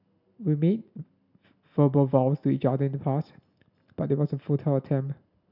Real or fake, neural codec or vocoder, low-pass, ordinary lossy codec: real; none; 5.4 kHz; none